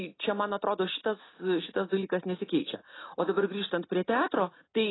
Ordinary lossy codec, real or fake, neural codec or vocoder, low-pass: AAC, 16 kbps; real; none; 7.2 kHz